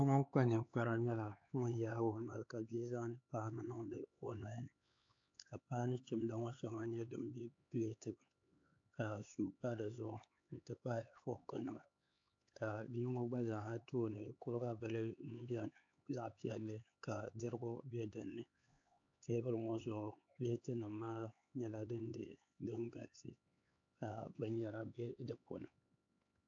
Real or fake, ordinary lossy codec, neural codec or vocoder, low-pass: fake; AAC, 48 kbps; codec, 16 kHz, 4 kbps, X-Codec, HuBERT features, trained on LibriSpeech; 7.2 kHz